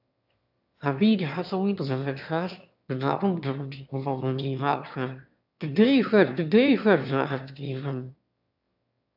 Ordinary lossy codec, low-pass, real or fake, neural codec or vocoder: AAC, 48 kbps; 5.4 kHz; fake; autoencoder, 22.05 kHz, a latent of 192 numbers a frame, VITS, trained on one speaker